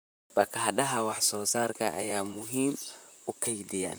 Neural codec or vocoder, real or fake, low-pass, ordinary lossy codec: vocoder, 44.1 kHz, 128 mel bands, Pupu-Vocoder; fake; none; none